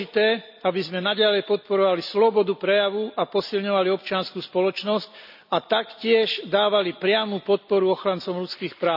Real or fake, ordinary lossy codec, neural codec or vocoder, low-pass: real; none; none; 5.4 kHz